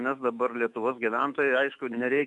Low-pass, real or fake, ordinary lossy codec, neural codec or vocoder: 10.8 kHz; fake; AAC, 64 kbps; autoencoder, 48 kHz, 128 numbers a frame, DAC-VAE, trained on Japanese speech